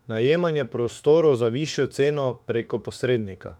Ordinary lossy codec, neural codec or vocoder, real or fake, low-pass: none; autoencoder, 48 kHz, 32 numbers a frame, DAC-VAE, trained on Japanese speech; fake; 19.8 kHz